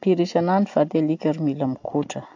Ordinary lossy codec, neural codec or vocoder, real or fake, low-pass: AAC, 48 kbps; none; real; 7.2 kHz